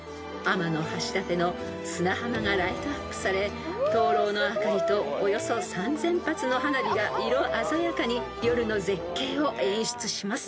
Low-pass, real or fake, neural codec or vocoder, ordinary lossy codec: none; real; none; none